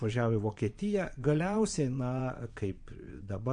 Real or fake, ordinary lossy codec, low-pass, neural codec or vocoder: fake; MP3, 48 kbps; 9.9 kHz; vocoder, 22.05 kHz, 80 mel bands, Vocos